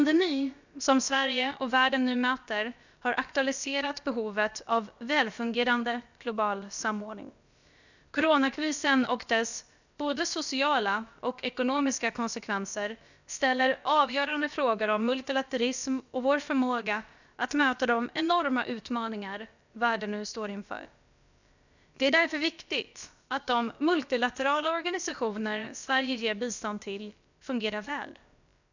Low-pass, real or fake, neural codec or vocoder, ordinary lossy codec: 7.2 kHz; fake; codec, 16 kHz, about 1 kbps, DyCAST, with the encoder's durations; none